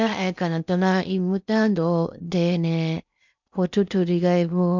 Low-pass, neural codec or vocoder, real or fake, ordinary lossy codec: 7.2 kHz; codec, 16 kHz in and 24 kHz out, 0.8 kbps, FocalCodec, streaming, 65536 codes; fake; none